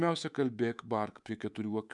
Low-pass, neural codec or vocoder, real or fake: 10.8 kHz; codec, 24 kHz, 3.1 kbps, DualCodec; fake